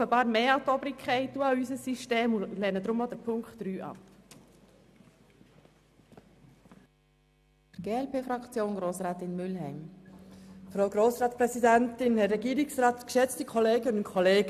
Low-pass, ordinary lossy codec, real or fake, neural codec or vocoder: 14.4 kHz; none; real; none